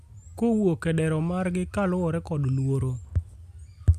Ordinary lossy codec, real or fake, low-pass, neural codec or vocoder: Opus, 64 kbps; real; 14.4 kHz; none